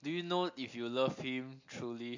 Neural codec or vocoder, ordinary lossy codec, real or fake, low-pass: none; AAC, 48 kbps; real; 7.2 kHz